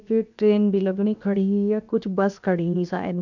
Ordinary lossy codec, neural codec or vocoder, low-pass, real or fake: none; codec, 16 kHz, 0.7 kbps, FocalCodec; 7.2 kHz; fake